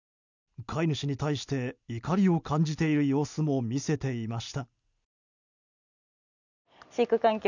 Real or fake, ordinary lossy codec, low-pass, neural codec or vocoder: real; none; 7.2 kHz; none